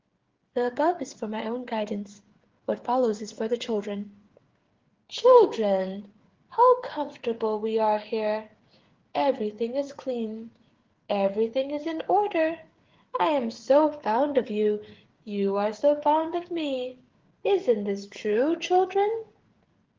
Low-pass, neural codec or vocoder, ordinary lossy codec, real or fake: 7.2 kHz; codec, 16 kHz, 8 kbps, FreqCodec, smaller model; Opus, 16 kbps; fake